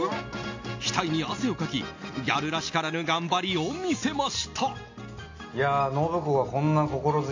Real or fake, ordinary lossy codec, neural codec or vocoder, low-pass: real; AAC, 48 kbps; none; 7.2 kHz